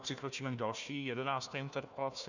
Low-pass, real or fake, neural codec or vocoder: 7.2 kHz; fake; codec, 16 kHz, 1 kbps, FunCodec, trained on Chinese and English, 50 frames a second